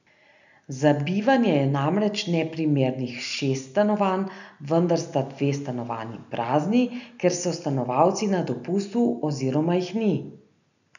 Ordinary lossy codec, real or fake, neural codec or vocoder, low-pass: none; real; none; 7.2 kHz